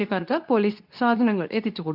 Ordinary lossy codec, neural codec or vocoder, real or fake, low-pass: none; codec, 24 kHz, 0.9 kbps, WavTokenizer, medium speech release version 2; fake; 5.4 kHz